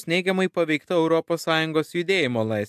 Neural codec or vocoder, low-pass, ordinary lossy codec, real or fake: none; 14.4 kHz; AAC, 96 kbps; real